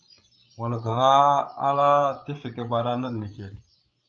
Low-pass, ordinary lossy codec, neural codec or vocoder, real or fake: 7.2 kHz; Opus, 24 kbps; codec, 16 kHz, 8 kbps, FreqCodec, larger model; fake